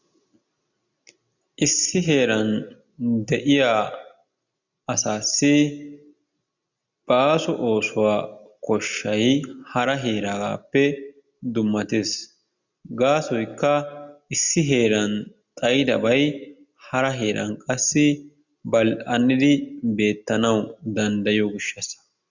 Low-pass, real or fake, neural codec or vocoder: 7.2 kHz; real; none